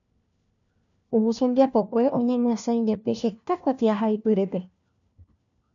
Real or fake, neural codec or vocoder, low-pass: fake; codec, 16 kHz, 1 kbps, FunCodec, trained on LibriTTS, 50 frames a second; 7.2 kHz